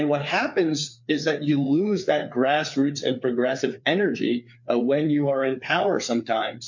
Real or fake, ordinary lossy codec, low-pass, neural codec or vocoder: fake; MP3, 48 kbps; 7.2 kHz; codec, 16 kHz, 4 kbps, FreqCodec, larger model